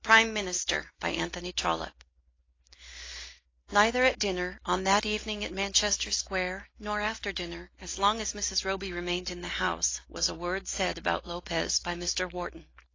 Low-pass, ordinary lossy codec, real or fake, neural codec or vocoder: 7.2 kHz; AAC, 32 kbps; real; none